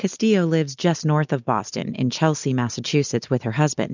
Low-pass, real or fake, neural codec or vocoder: 7.2 kHz; real; none